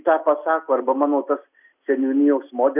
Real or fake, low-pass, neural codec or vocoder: real; 3.6 kHz; none